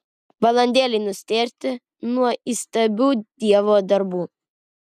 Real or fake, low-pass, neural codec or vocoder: real; 14.4 kHz; none